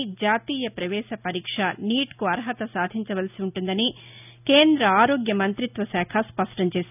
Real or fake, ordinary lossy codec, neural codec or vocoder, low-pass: real; none; none; 3.6 kHz